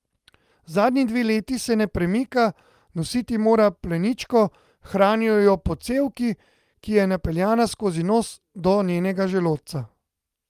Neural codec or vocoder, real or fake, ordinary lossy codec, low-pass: none; real; Opus, 32 kbps; 14.4 kHz